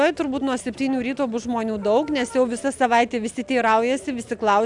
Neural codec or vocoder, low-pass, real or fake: none; 10.8 kHz; real